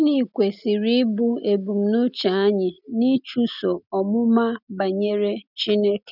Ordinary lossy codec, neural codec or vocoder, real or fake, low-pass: none; none; real; 5.4 kHz